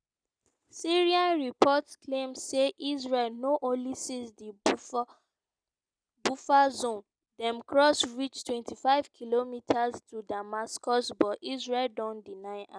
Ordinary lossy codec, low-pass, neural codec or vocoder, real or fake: none; 9.9 kHz; none; real